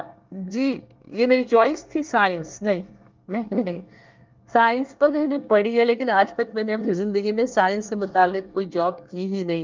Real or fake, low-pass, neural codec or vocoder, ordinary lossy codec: fake; 7.2 kHz; codec, 24 kHz, 1 kbps, SNAC; Opus, 24 kbps